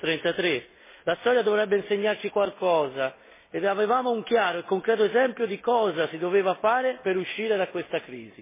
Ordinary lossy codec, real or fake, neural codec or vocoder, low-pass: MP3, 16 kbps; real; none; 3.6 kHz